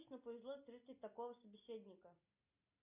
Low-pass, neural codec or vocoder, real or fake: 3.6 kHz; none; real